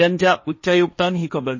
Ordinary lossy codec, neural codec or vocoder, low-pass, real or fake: MP3, 32 kbps; codec, 16 kHz, 1.1 kbps, Voila-Tokenizer; 7.2 kHz; fake